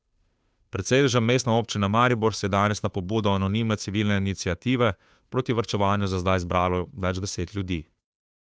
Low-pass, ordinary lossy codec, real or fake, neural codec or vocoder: none; none; fake; codec, 16 kHz, 2 kbps, FunCodec, trained on Chinese and English, 25 frames a second